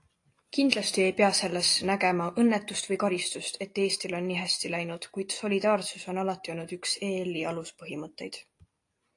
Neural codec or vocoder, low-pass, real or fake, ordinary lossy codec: none; 10.8 kHz; real; AAC, 48 kbps